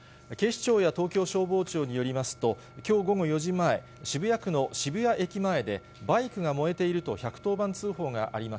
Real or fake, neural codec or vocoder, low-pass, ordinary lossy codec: real; none; none; none